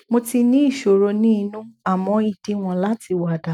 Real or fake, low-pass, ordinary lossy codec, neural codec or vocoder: real; 19.8 kHz; none; none